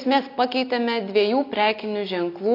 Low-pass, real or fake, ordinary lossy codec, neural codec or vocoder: 5.4 kHz; real; AAC, 32 kbps; none